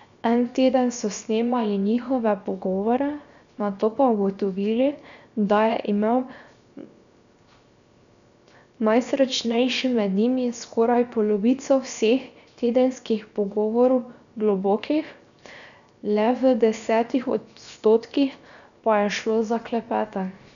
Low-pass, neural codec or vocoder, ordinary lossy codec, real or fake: 7.2 kHz; codec, 16 kHz, 0.7 kbps, FocalCodec; none; fake